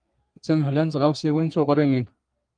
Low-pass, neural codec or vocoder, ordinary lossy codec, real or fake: 9.9 kHz; codec, 32 kHz, 1.9 kbps, SNAC; Opus, 32 kbps; fake